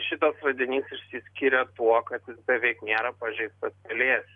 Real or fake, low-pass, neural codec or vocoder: real; 10.8 kHz; none